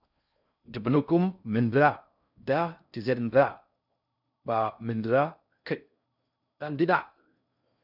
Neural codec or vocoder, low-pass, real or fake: codec, 16 kHz in and 24 kHz out, 0.6 kbps, FocalCodec, streaming, 4096 codes; 5.4 kHz; fake